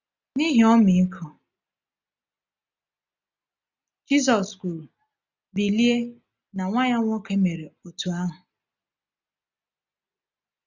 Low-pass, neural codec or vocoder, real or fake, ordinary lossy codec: 7.2 kHz; none; real; none